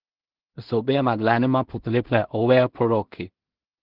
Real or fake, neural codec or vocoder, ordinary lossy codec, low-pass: fake; codec, 16 kHz in and 24 kHz out, 0.4 kbps, LongCat-Audio-Codec, two codebook decoder; Opus, 16 kbps; 5.4 kHz